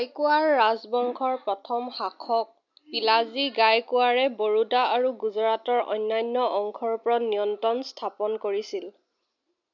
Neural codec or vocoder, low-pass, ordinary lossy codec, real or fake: none; 7.2 kHz; none; real